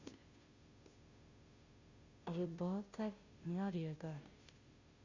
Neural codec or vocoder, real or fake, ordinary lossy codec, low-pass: codec, 16 kHz, 0.5 kbps, FunCodec, trained on Chinese and English, 25 frames a second; fake; Opus, 64 kbps; 7.2 kHz